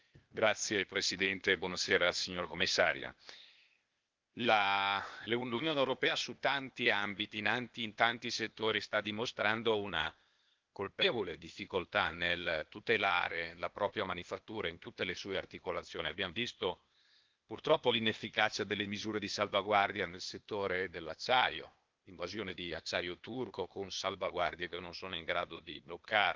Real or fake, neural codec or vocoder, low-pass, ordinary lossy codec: fake; codec, 16 kHz, 0.8 kbps, ZipCodec; 7.2 kHz; Opus, 32 kbps